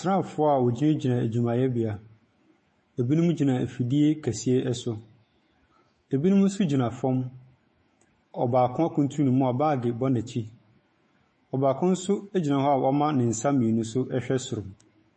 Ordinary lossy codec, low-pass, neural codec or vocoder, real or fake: MP3, 32 kbps; 10.8 kHz; autoencoder, 48 kHz, 128 numbers a frame, DAC-VAE, trained on Japanese speech; fake